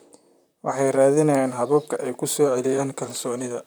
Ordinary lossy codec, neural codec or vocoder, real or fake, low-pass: none; vocoder, 44.1 kHz, 128 mel bands, Pupu-Vocoder; fake; none